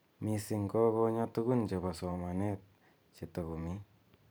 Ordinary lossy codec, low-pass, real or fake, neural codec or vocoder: none; none; fake; vocoder, 44.1 kHz, 128 mel bands every 512 samples, BigVGAN v2